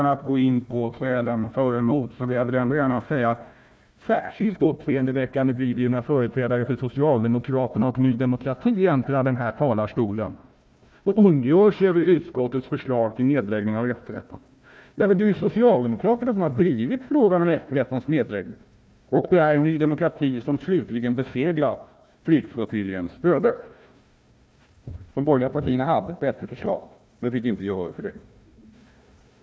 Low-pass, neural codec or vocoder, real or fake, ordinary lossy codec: none; codec, 16 kHz, 1 kbps, FunCodec, trained on Chinese and English, 50 frames a second; fake; none